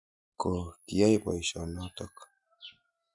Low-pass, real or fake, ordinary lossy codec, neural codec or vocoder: 10.8 kHz; real; none; none